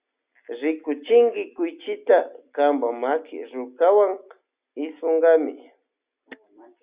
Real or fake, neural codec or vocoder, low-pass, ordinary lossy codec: real; none; 3.6 kHz; Opus, 64 kbps